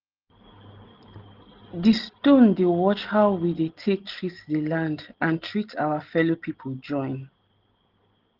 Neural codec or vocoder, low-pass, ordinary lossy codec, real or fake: none; 5.4 kHz; Opus, 16 kbps; real